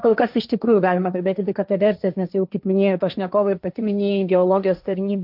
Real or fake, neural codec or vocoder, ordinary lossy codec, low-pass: fake; codec, 16 kHz, 1.1 kbps, Voila-Tokenizer; AAC, 48 kbps; 5.4 kHz